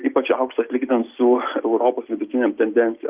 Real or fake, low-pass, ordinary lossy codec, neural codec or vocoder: real; 3.6 kHz; Opus, 32 kbps; none